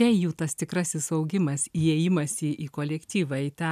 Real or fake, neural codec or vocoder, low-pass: real; none; 14.4 kHz